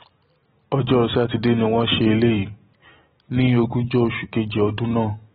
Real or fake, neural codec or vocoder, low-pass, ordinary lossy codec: real; none; 14.4 kHz; AAC, 16 kbps